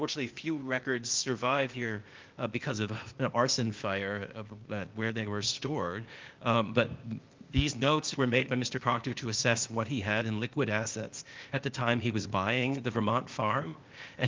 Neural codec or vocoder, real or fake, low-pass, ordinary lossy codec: codec, 16 kHz, 0.8 kbps, ZipCodec; fake; 7.2 kHz; Opus, 24 kbps